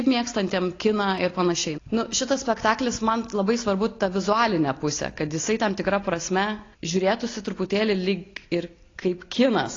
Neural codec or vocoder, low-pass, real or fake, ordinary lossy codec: none; 7.2 kHz; real; AAC, 32 kbps